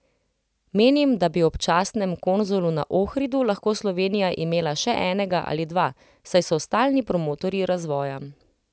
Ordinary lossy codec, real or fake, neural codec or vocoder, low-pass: none; real; none; none